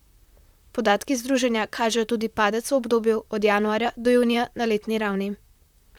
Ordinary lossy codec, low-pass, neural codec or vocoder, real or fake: none; 19.8 kHz; vocoder, 44.1 kHz, 128 mel bands, Pupu-Vocoder; fake